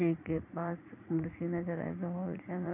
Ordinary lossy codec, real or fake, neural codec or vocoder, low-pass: none; fake; vocoder, 44.1 kHz, 80 mel bands, Vocos; 3.6 kHz